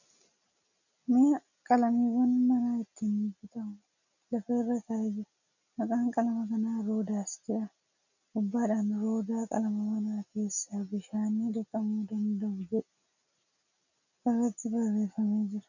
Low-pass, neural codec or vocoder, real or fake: 7.2 kHz; none; real